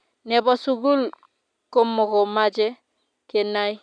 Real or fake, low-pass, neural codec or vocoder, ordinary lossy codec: real; 9.9 kHz; none; none